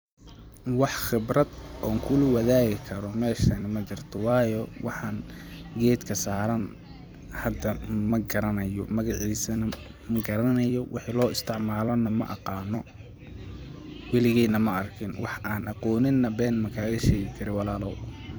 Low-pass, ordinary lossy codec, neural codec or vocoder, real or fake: none; none; vocoder, 44.1 kHz, 128 mel bands every 256 samples, BigVGAN v2; fake